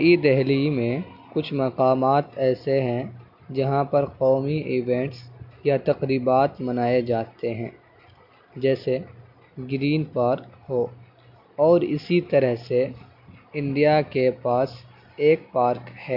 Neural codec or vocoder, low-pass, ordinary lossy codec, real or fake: none; 5.4 kHz; none; real